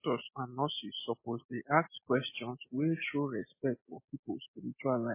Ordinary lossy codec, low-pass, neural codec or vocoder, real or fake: MP3, 16 kbps; 3.6 kHz; none; real